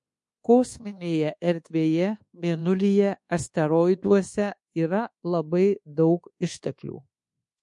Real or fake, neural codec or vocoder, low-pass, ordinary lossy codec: fake; codec, 24 kHz, 1.2 kbps, DualCodec; 10.8 kHz; MP3, 48 kbps